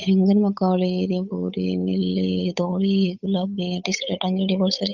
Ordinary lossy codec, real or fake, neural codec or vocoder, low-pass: none; fake; codec, 16 kHz, 8 kbps, FunCodec, trained on Chinese and English, 25 frames a second; 7.2 kHz